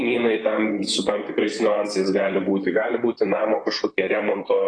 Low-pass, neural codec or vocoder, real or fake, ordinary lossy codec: 9.9 kHz; vocoder, 44.1 kHz, 128 mel bands, Pupu-Vocoder; fake; AAC, 32 kbps